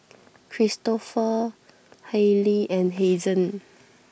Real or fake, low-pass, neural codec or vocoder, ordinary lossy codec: real; none; none; none